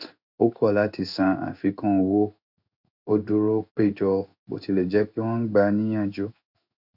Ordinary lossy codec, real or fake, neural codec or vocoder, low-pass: none; fake; codec, 16 kHz in and 24 kHz out, 1 kbps, XY-Tokenizer; 5.4 kHz